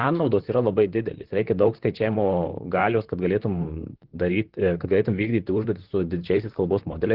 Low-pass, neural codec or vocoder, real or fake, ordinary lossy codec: 5.4 kHz; vocoder, 44.1 kHz, 128 mel bands, Pupu-Vocoder; fake; Opus, 16 kbps